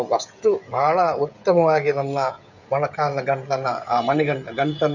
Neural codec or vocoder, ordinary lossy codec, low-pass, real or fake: codec, 16 kHz, 16 kbps, FreqCodec, smaller model; none; 7.2 kHz; fake